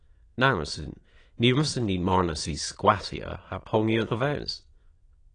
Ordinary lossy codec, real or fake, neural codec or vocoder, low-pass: AAC, 32 kbps; fake; autoencoder, 22.05 kHz, a latent of 192 numbers a frame, VITS, trained on many speakers; 9.9 kHz